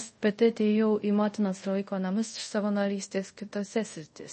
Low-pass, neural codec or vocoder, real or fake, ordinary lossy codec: 10.8 kHz; codec, 24 kHz, 0.5 kbps, DualCodec; fake; MP3, 32 kbps